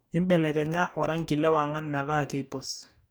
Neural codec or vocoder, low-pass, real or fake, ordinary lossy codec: codec, 44.1 kHz, 2.6 kbps, DAC; none; fake; none